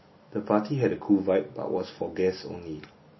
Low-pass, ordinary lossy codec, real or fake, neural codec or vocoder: 7.2 kHz; MP3, 24 kbps; real; none